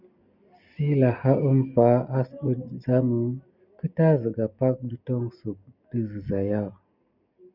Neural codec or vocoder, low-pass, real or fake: none; 5.4 kHz; real